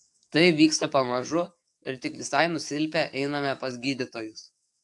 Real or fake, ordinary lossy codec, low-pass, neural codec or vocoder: fake; AAC, 64 kbps; 10.8 kHz; codec, 44.1 kHz, 7.8 kbps, DAC